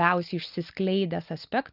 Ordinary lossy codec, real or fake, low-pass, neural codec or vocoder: Opus, 24 kbps; real; 5.4 kHz; none